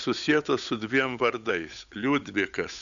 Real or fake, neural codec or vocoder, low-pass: fake; codec, 16 kHz, 8 kbps, FunCodec, trained on Chinese and English, 25 frames a second; 7.2 kHz